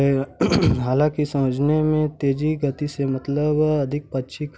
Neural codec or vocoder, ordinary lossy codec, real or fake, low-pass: none; none; real; none